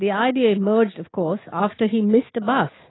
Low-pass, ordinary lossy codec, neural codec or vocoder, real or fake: 7.2 kHz; AAC, 16 kbps; none; real